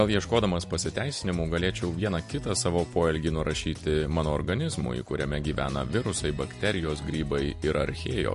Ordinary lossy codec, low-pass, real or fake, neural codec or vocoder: MP3, 48 kbps; 14.4 kHz; real; none